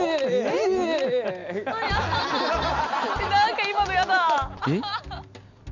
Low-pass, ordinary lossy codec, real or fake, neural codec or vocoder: 7.2 kHz; none; real; none